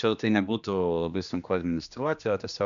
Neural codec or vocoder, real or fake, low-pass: codec, 16 kHz, 2 kbps, X-Codec, HuBERT features, trained on general audio; fake; 7.2 kHz